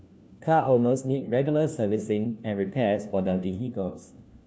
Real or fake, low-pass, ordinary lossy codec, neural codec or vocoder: fake; none; none; codec, 16 kHz, 1 kbps, FunCodec, trained on LibriTTS, 50 frames a second